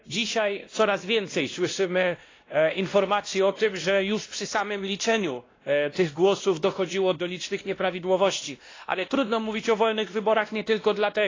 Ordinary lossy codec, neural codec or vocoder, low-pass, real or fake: AAC, 32 kbps; codec, 16 kHz, 1 kbps, X-Codec, WavLM features, trained on Multilingual LibriSpeech; 7.2 kHz; fake